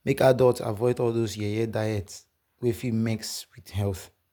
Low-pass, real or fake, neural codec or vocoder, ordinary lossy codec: none; real; none; none